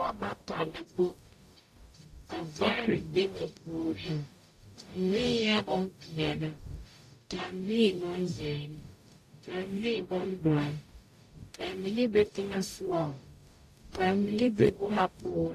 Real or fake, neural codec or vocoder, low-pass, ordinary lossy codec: fake; codec, 44.1 kHz, 0.9 kbps, DAC; 14.4 kHz; AAC, 64 kbps